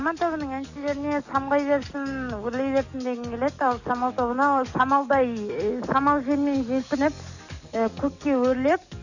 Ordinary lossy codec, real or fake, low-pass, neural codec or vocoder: none; fake; 7.2 kHz; codec, 44.1 kHz, 7.8 kbps, DAC